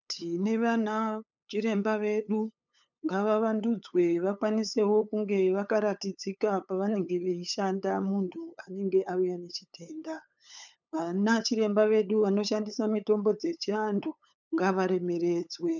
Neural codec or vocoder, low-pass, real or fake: codec, 16 kHz, 8 kbps, FunCodec, trained on LibriTTS, 25 frames a second; 7.2 kHz; fake